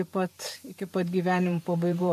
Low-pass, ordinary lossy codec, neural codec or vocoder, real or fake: 14.4 kHz; MP3, 96 kbps; vocoder, 44.1 kHz, 128 mel bands every 512 samples, BigVGAN v2; fake